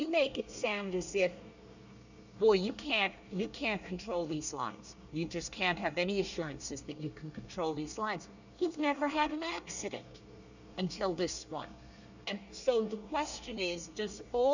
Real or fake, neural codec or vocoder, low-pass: fake; codec, 24 kHz, 1 kbps, SNAC; 7.2 kHz